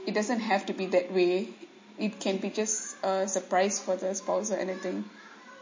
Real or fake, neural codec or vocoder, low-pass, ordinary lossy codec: real; none; 7.2 kHz; MP3, 32 kbps